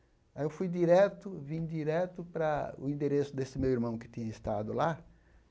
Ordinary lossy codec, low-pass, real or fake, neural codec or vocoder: none; none; real; none